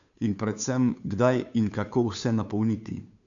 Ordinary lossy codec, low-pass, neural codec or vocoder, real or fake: none; 7.2 kHz; codec, 16 kHz, 2 kbps, FunCodec, trained on Chinese and English, 25 frames a second; fake